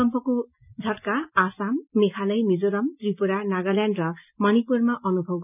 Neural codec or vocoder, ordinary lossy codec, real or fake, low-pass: none; none; real; 3.6 kHz